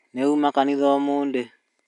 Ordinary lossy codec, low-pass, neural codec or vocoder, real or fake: none; 10.8 kHz; none; real